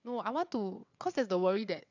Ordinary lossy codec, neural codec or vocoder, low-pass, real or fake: none; vocoder, 22.05 kHz, 80 mel bands, WaveNeXt; 7.2 kHz; fake